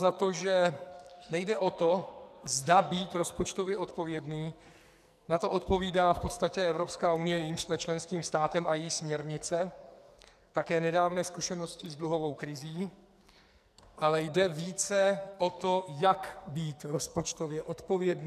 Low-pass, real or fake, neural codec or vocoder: 14.4 kHz; fake; codec, 44.1 kHz, 2.6 kbps, SNAC